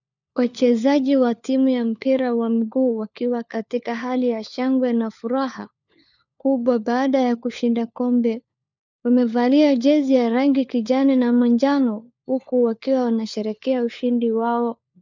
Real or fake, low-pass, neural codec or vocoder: fake; 7.2 kHz; codec, 16 kHz, 4 kbps, FunCodec, trained on LibriTTS, 50 frames a second